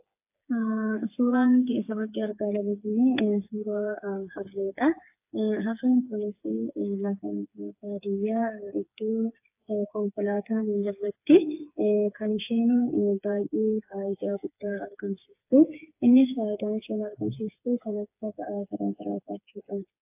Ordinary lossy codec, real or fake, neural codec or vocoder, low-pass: AAC, 32 kbps; fake; codec, 16 kHz, 4 kbps, FreqCodec, smaller model; 3.6 kHz